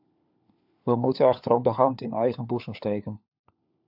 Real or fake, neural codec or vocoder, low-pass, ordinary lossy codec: fake; codec, 16 kHz, 4 kbps, FunCodec, trained on LibriTTS, 50 frames a second; 5.4 kHz; AAC, 48 kbps